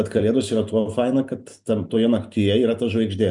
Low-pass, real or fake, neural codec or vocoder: 10.8 kHz; real; none